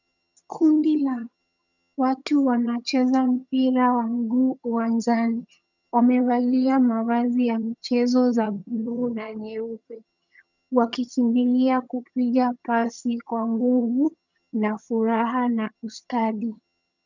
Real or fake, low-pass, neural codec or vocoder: fake; 7.2 kHz; vocoder, 22.05 kHz, 80 mel bands, HiFi-GAN